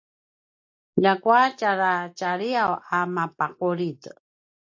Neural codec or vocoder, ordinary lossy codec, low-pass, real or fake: none; AAC, 48 kbps; 7.2 kHz; real